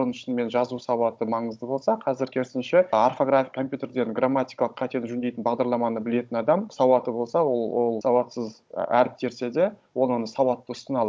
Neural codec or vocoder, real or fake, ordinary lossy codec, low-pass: codec, 16 kHz, 16 kbps, FunCodec, trained on Chinese and English, 50 frames a second; fake; none; none